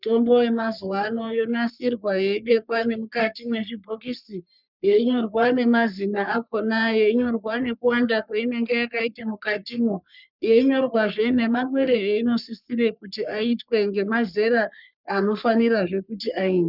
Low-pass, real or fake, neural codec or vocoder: 5.4 kHz; fake; codec, 44.1 kHz, 3.4 kbps, Pupu-Codec